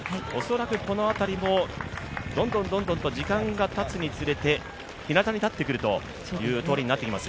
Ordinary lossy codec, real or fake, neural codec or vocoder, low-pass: none; real; none; none